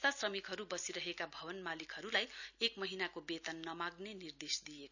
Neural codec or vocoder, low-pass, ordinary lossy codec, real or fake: none; 7.2 kHz; none; real